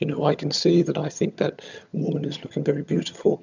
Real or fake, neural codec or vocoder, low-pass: fake; vocoder, 22.05 kHz, 80 mel bands, HiFi-GAN; 7.2 kHz